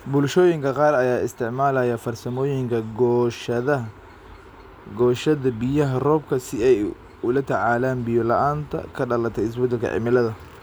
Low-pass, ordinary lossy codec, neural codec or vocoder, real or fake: none; none; none; real